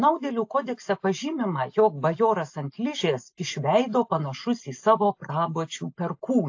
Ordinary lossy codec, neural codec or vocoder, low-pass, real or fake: AAC, 48 kbps; none; 7.2 kHz; real